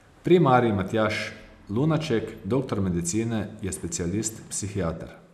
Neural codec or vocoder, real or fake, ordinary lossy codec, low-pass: none; real; none; 14.4 kHz